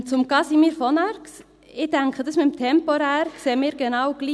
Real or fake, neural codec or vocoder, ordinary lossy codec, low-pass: real; none; none; none